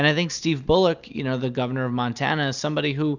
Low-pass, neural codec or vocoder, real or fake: 7.2 kHz; none; real